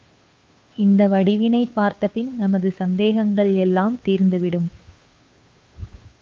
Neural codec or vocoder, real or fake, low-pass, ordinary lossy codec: codec, 16 kHz, 2 kbps, FunCodec, trained on Chinese and English, 25 frames a second; fake; 7.2 kHz; Opus, 24 kbps